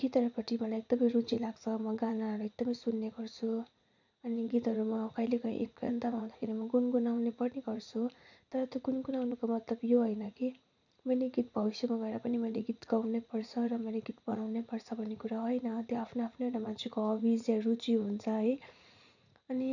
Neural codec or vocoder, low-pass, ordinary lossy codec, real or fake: vocoder, 44.1 kHz, 80 mel bands, Vocos; 7.2 kHz; none; fake